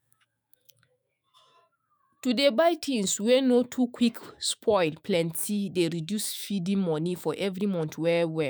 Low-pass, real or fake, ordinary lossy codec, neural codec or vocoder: none; fake; none; autoencoder, 48 kHz, 128 numbers a frame, DAC-VAE, trained on Japanese speech